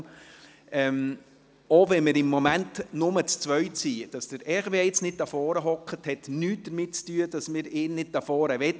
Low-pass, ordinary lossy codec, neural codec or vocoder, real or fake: none; none; none; real